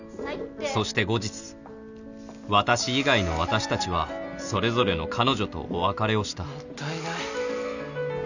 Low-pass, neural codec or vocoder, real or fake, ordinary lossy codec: 7.2 kHz; none; real; none